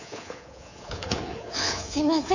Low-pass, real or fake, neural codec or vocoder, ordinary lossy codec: 7.2 kHz; fake; codec, 24 kHz, 3.1 kbps, DualCodec; none